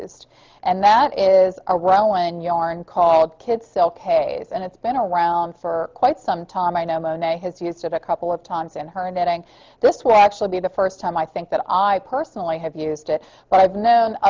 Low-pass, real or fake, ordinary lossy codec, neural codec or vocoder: 7.2 kHz; real; Opus, 24 kbps; none